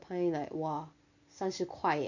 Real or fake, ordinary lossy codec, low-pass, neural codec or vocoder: real; none; 7.2 kHz; none